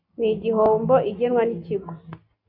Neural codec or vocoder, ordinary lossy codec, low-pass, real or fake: none; MP3, 32 kbps; 5.4 kHz; real